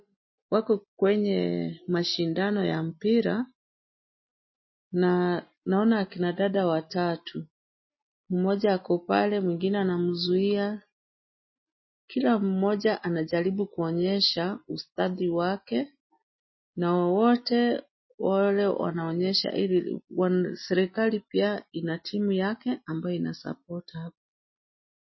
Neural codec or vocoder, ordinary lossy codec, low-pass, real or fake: none; MP3, 24 kbps; 7.2 kHz; real